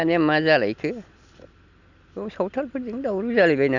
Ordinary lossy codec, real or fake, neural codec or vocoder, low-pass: none; real; none; 7.2 kHz